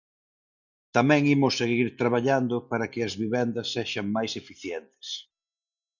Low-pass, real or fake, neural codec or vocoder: 7.2 kHz; real; none